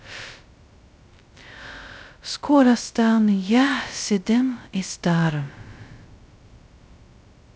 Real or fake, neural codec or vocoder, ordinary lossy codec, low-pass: fake; codec, 16 kHz, 0.2 kbps, FocalCodec; none; none